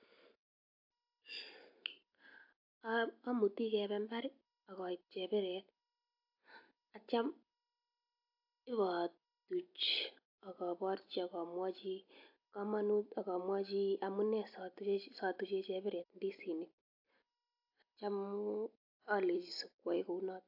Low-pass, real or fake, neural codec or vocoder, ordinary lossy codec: 5.4 kHz; real; none; AAC, 48 kbps